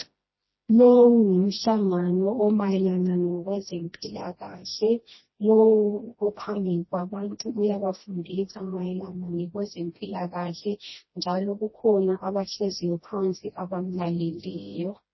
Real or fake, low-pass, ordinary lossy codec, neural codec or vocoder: fake; 7.2 kHz; MP3, 24 kbps; codec, 16 kHz, 1 kbps, FreqCodec, smaller model